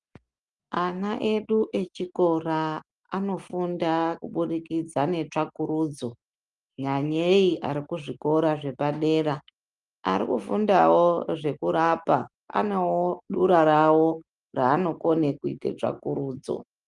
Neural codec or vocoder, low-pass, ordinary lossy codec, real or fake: none; 10.8 kHz; Opus, 32 kbps; real